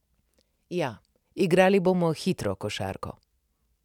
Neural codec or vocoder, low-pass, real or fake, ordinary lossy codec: none; 19.8 kHz; real; none